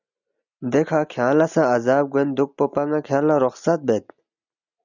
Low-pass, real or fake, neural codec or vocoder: 7.2 kHz; real; none